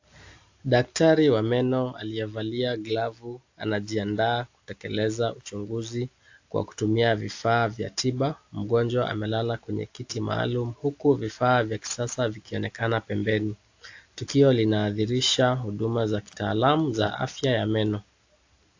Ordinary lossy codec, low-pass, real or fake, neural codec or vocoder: AAC, 48 kbps; 7.2 kHz; real; none